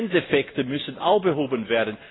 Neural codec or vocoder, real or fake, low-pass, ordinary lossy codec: none; real; 7.2 kHz; AAC, 16 kbps